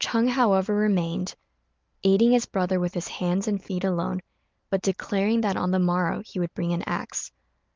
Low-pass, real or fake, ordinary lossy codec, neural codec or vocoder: 7.2 kHz; real; Opus, 24 kbps; none